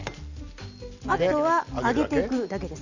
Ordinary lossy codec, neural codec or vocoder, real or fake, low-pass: none; none; real; 7.2 kHz